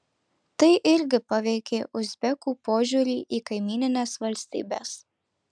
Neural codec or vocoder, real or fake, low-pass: none; real; 9.9 kHz